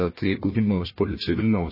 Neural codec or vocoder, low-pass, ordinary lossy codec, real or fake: codec, 16 kHz, 1 kbps, FunCodec, trained on Chinese and English, 50 frames a second; 5.4 kHz; MP3, 24 kbps; fake